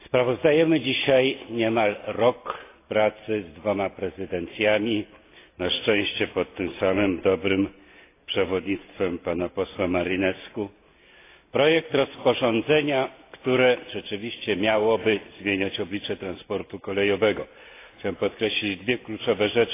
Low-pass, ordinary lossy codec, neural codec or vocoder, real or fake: 3.6 kHz; AAC, 24 kbps; none; real